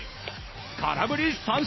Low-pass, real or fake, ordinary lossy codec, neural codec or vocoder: 7.2 kHz; real; MP3, 24 kbps; none